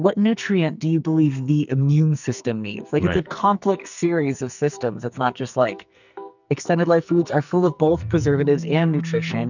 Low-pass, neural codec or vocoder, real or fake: 7.2 kHz; codec, 44.1 kHz, 2.6 kbps, SNAC; fake